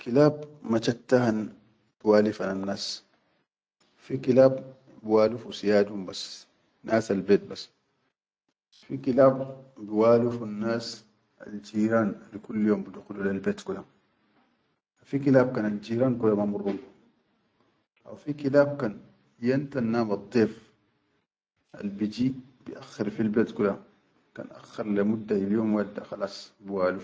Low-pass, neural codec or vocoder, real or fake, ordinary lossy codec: none; none; real; none